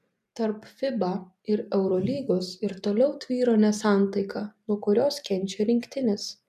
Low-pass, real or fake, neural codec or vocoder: 14.4 kHz; real; none